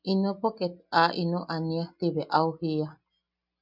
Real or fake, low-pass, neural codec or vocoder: real; 5.4 kHz; none